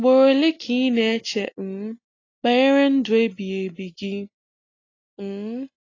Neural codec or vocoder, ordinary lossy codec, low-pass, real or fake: none; AAC, 32 kbps; 7.2 kHz; real